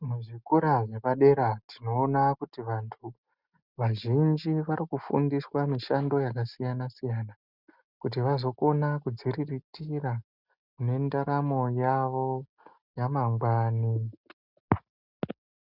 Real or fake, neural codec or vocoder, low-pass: real; none; 5.4 kHz